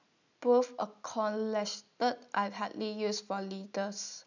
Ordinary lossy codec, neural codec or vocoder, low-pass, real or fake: none; none; 7.2 kHz; real